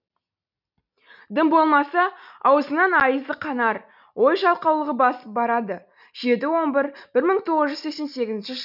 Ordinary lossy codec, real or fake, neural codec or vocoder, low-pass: none; real; none; 5.4 kHz